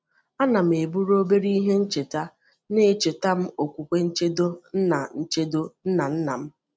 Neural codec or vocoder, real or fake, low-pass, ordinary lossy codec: none; real; none; none